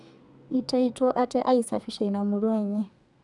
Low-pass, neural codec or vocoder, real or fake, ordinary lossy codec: 10.8 kHz; codec, 32 kHz, 1.9 kbps, SNAC; fake; none